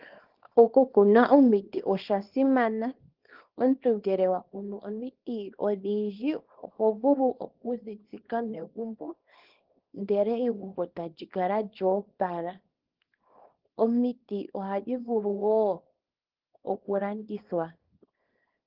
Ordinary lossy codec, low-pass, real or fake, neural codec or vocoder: Opus, 16 kbps; 5.4 kHz; fake; codec, 24 kHz, 0.9 kbps, WavTokenizer, small release